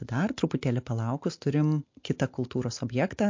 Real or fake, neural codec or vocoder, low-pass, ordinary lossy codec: real; none; 7.2 kHz; MP3, 48 kbps